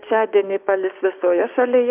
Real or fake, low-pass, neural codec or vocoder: fake; 3.6 kHz; codec, 16 kHz, 6 kbps, DAC